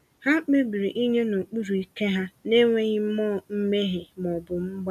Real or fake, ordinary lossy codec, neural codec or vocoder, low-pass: real; none; none; 14.4 kHz